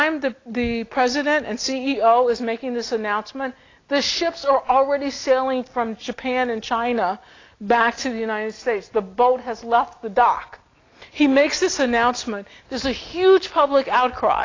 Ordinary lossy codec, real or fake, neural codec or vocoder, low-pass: AAC, 32 kbps; real; none; 7.2 kHz